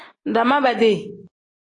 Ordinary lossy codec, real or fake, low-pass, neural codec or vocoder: AAC, 32 kbps; real; 10.8 kHz; none